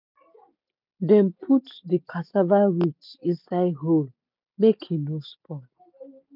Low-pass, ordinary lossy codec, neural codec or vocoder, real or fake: 5.4 kHz; none; none; real